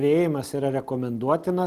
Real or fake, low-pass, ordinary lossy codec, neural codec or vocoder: real; 14.4 kHz; Opus, 24 kbps; none